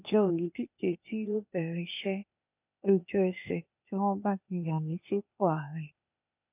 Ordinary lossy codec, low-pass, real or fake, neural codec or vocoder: none; 3.6 kHz; fake; codec, 16 kHz, 0.8 kbps, ZipCodec